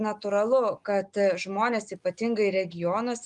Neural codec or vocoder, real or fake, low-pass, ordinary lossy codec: none; real; 10.8 kHz; Opus, 32 kbps